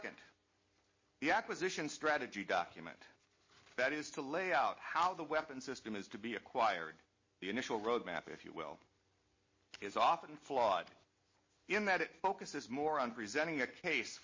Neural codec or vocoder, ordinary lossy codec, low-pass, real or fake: none; MP3, 32 kbps; 7.2 kHz; real